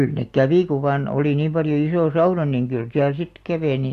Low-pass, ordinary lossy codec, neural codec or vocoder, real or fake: 14.4 kHz; AAC, 64 kbps; none; real